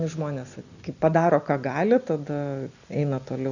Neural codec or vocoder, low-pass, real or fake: none; 7.2 kHz; real